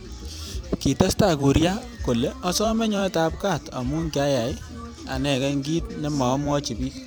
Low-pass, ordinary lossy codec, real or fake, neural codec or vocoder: none; none; fake; vocoder, 44.1 kHz, 128 mel bands every 512 samples, BigVGAN v2